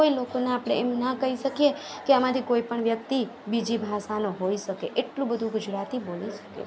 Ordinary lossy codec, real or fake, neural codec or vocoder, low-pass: none; real; none; none